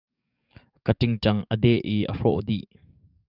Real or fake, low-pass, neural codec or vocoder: fake; 5.4 kHz; codec, 44.1 kHz, 7.8 kbps, DAC